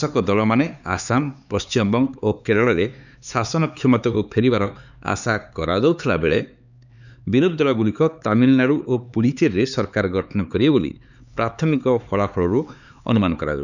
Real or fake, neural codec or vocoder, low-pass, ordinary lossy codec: fake; codec, 16 kHz, 4 kbps, X-Codec, HuBERT features, trained on LibriSpeech; 7.2 kHz; none